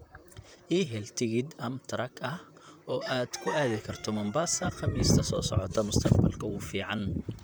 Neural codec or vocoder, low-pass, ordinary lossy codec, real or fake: vocoder, 44.1 kHz, 128 mel bands, Pupu-Vocoder; none; none; fake